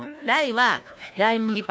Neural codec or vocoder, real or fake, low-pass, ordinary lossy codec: codec, 16 kHz, 1 kbps, FunCodec, trained on LibriTTS, 50 frames a second; fake; none; none